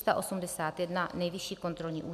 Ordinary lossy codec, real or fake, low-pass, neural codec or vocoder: MP3, 96 kbps; real; 14.4 kHz; none